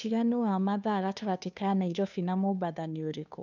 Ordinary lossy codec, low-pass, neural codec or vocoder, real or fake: none; 7.2 kHz; codec, 16 kHz, 2 kbps, FunCodec, trained on Chinese and English, 25 frames a second; fake